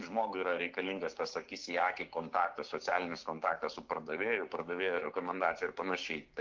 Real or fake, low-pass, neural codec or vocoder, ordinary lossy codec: fake; 7.2 kHz; codec, 16 kHz, 6 kbps, DAC; Opus, 32 kbps